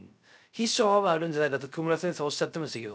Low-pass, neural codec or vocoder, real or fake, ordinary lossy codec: none; codec, 16 kHz, 0.3 kbps, FocalCodec; fake; none